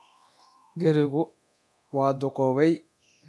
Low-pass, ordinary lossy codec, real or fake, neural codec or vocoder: none; none; fake; codec, 24 kHz, 0.9 kbps, DualCodec